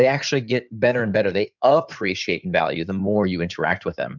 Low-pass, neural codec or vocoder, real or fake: 7.2 kHz; codec, 16 kHz in and 24 kHz out, 2.2 kbps, FireRedTTS-2 codec; fake